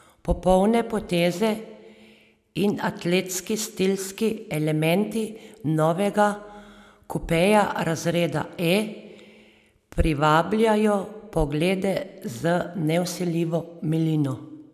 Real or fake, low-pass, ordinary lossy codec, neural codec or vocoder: real; 14.4 kHz; none; none